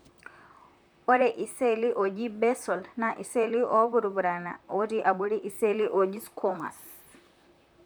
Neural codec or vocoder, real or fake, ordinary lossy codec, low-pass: vocoder, 44.1 kHz, 128 mel bands, Pupu-Vocoder; fake; none; none